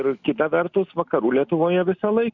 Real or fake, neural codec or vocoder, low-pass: real; none; 7.2 kHz